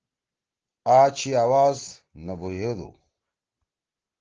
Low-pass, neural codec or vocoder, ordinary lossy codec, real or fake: 7.2 kHz; none; Opus, 16 kbps; real